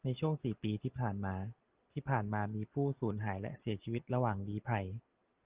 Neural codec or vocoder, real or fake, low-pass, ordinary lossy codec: none; real; 3.6 kHz; Opus, 32 kbps